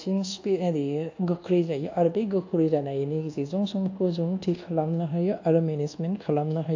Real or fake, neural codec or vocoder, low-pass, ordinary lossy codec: fake; codec, 24 kHz, 1.2 kbps, DualCodec; 7.2 kHz; AAC, 48 kbps